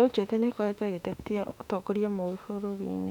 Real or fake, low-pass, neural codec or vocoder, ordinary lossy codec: fake; 19.8 kHz; autoencoder, 48 kHz, 32 numbers a frame, DAC-VAE, trained on Japanese speech; none